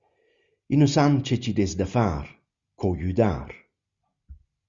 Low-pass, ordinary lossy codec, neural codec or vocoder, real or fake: 7.2 kHz; Opus, 64 kbps; none; real